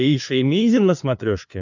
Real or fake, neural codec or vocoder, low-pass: fake; codec, 16 kHz, 2 kbps, FunCodec, trained on LibriTTS, 25 frames a second; 7.2 kHz